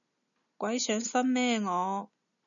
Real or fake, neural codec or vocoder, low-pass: real; none; 7.2 kHz